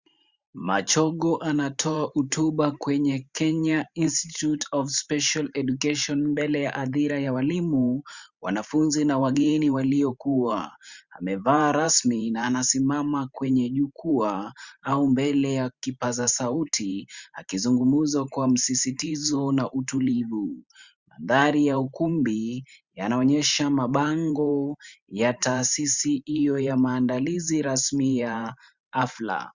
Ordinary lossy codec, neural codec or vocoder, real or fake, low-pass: Opus, 64 kbps; vocoder, 44.1 kHz, 128 mel bands every 512 samples, BigVGAN v2; fake; 7.2 kHz